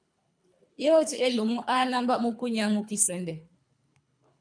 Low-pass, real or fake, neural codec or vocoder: 9.9 kHz; fake; codec, 24 kHz, 3 kbps, HILCodec